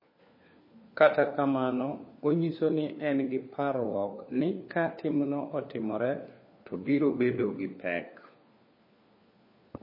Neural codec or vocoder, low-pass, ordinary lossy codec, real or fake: codec, 16 kHz, 4 kbps, FunCodec, trained on LibriTTS, 50 frames a second; 5.4 kHz; MP3, 24 kbps; fake